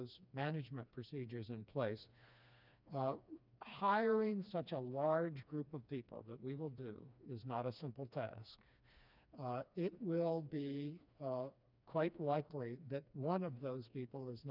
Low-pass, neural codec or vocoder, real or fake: 5.4 kHz; codec, 16 kHz, 2 kbps, FreqCodec, smaller model; fake